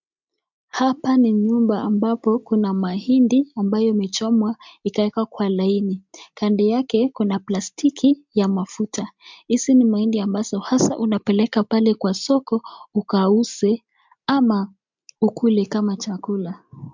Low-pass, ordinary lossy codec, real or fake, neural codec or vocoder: 7.2 kHz; MP3, 64 kbps; real; none